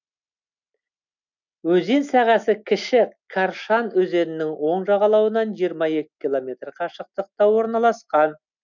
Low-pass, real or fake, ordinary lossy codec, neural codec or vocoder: 7.2 kHz; real; none; none